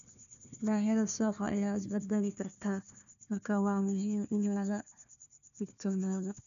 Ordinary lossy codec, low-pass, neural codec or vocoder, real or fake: none; 7.2 kHz; codec, 16 kHz, 1 kbps, FunCodec, trained on Chinese and English, 50 frames a second; fake